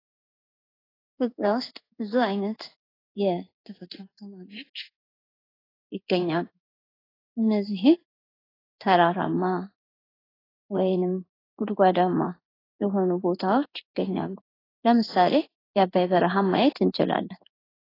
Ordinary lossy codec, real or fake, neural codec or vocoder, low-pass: AAC, 24 kbps; fake; codec, 16 kHz in and 24 kHz out, 1 kbps, XY-Tokenizer; 5.4 kHz